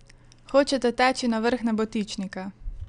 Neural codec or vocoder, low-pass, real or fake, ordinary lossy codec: none; 9.9 kHz; real; none